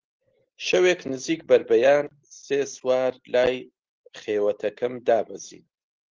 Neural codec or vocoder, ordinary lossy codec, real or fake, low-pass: none; Opus, 16 kbps; real; 7.2 kHz